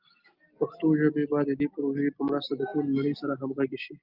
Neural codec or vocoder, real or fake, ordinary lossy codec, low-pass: none; real; Opus, 32 kbps; 5.4 kHz